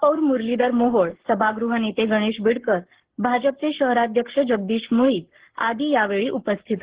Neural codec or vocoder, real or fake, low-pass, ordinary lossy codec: codec, 44.1 kHz, 7.8 kbps, Pupu-Codec; fake; 3.6 kHz; Opus, 16 kbps